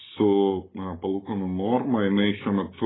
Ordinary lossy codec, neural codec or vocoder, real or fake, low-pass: AAC, 16 kbps; codec, 44.1 kHz, 7.8 kbps, Pupu-Codec; fake; 7.2 kHz